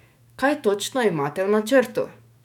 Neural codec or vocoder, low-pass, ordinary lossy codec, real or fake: autoencoder, 48 kHz, 128 numbers a frame, DAC-VAE, trained on Japanese speech; 19.8 kHz; none; fake